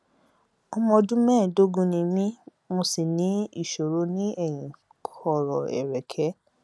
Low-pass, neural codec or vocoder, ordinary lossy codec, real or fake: none; none; none; real